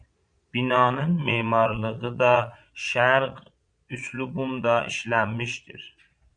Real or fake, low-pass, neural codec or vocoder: fake; 9.9 kHz; vocoder, 22.05 kHz, 80 mel bands, Vocos